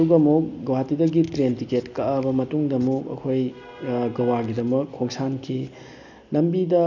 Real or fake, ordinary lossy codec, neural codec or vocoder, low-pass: real; none; none; 7.2 kHz